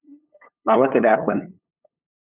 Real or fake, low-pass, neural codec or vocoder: fake; 3.6 kHz; codec, 16 kHz, 8 kbps, FunCodec, trained on LibriTTS, 25 frames a second